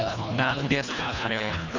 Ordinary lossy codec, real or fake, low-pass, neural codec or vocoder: none; fake; 7.2 kHz; codec, 24 kHz, 1.5 kbps, HILCodec